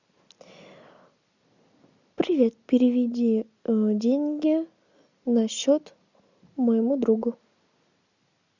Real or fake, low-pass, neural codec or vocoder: real; 7.2 kHz; none